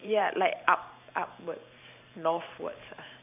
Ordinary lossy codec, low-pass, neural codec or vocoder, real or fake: none; 3.6 kHz; vocoder, 44.1 kHz, 128 mel bands every 512 samples, BigVGAN v2; fake